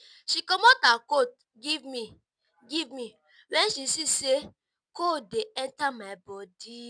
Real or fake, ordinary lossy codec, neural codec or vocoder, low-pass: real; none; none; 9.9 kHz